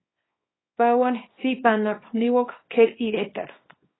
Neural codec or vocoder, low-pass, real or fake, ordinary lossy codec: codec, 24 kHz, 0.9 kbps, WavTokenizer, small release; 7.2 kHz; fake; AAC, 16 kbps